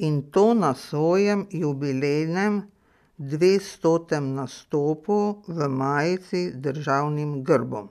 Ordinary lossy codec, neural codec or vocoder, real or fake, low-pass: none; none; real; 14.4 kHz